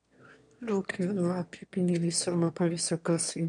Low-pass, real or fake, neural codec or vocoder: 9.9 kHz; fake; autoencoder, 22.05 kHz, a latent of 192 numbers a frame, VITS, trained on one speaker